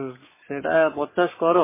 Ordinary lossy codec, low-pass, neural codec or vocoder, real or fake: MP3, 16 kbps; 3.6 kHz; codec, 44.1 kHz, 7.8 kbps, Pupu-Codec; fake